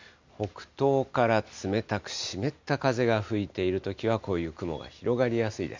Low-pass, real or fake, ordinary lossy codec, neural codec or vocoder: 7.2 kHz; real; MP3, 64 kbps; none